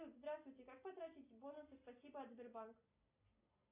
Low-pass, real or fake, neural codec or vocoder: 3.6 kHz; real; none